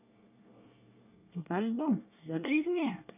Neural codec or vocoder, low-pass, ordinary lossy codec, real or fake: codec, 24 kHz, 1 kbps, SNAC; 3.6 kHz; none; fake